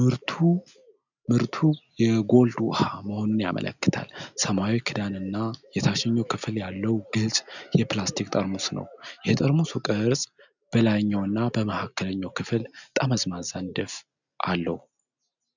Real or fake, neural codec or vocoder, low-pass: real; none; 7.2 kHz